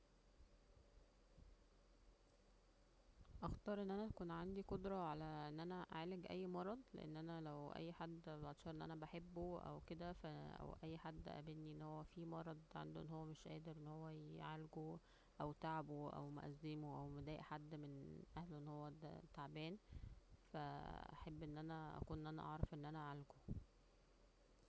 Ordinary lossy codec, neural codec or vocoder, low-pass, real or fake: none; none; none; real